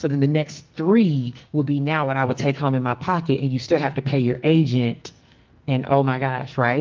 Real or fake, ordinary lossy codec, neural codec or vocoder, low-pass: fake; Opus, 24 kbps; codec, 44.1 kHz, 2.6 kbps, SNAC; 7.2 kHz